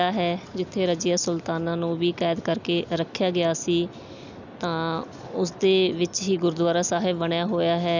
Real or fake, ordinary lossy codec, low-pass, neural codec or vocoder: real; none; 7.2 kHz; none